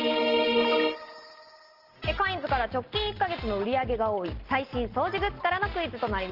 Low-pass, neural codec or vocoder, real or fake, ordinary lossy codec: 5.4 kHz; none; real; Opus, 16 kbps